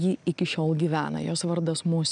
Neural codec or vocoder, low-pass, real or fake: none; 9.9 kHz; real